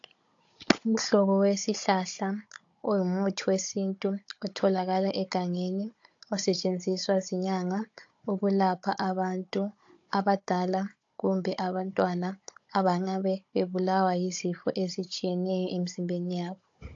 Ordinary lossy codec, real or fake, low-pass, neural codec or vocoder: AAC, 48 kbps; fake; 7.2 kHz; codec, 16 kHz, 16 kbps, FunCodec, trained on Chinese and English, 50 frames a second